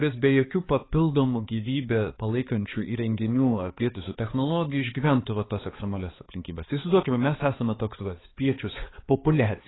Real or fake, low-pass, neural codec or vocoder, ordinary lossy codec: fake; 7.2 kHz; codec, 16 kHz, 4 kbps, X-Codec, HuBERT features, trained on balanced general audio; AAC, 16 kbps